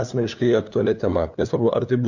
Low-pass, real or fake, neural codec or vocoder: 7.2 kHz; fake; codec, 16 kHz, 2 kbps, FunCodec, trained on LibriTTS, 25 frames a second